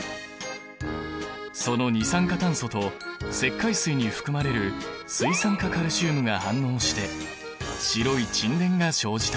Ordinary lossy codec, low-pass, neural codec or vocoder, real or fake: none; none; none; real